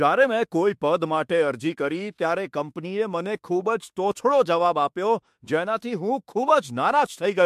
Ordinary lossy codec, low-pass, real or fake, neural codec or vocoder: MP3, 64 kbps; 14.4 kHz; fake; autoencoder, 48 kHz, 32 numbers a frame, DAC-VAE, trained on Japanese speech